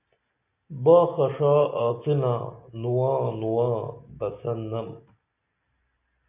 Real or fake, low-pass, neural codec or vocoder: real; 3.6 kHz; none